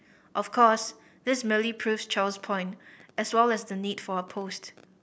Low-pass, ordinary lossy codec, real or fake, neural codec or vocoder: none; none; real; none